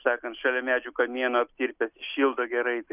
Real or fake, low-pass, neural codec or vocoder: real; 3.6 kHz; none